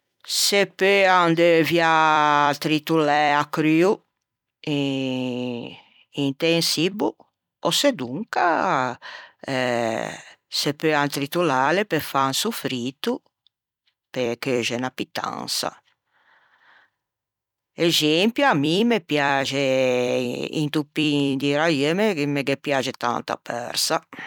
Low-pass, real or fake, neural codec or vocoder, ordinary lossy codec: 19.8 kHz; fake; vocoder, 44.1 kHz, 128 mel bands every 256 samples, BigVGAN v2; none